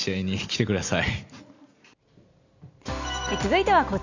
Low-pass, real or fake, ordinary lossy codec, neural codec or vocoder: 7.2 kHz; real; none; none